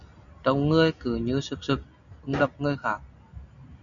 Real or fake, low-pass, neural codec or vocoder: real; 7.2 kHz; none